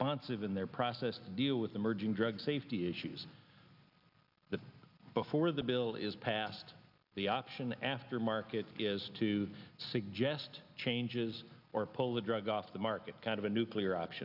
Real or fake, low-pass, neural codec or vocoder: real; 5.4 kHz; none